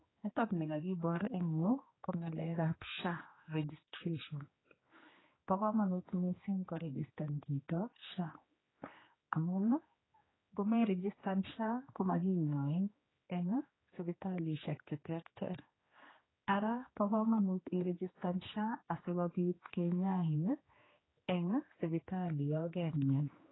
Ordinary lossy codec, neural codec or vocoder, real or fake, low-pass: AAC, 16 kbps; codec, 16 kHz, 2 kbps, X-Codec, HuBERT features, trained on general audio; fake; 7.2 kHz